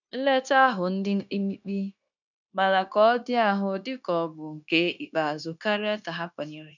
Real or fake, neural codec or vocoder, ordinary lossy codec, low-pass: fake; codec, 16 kHz, 0.9 kbps, LongCat-Audio-Codec; none; 7.2 kHz